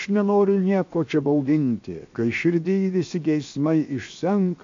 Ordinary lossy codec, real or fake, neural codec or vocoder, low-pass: MP3, 48 kbps; fake; codec, 16 kHz, 0.7 kbps, FocalCodec; 7.2 kHz